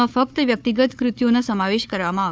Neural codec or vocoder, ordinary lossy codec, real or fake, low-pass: codec, 16 kHz, 4 kbps, FunCodec, trained on Chinese and English, 50 frames a second; none; fake; none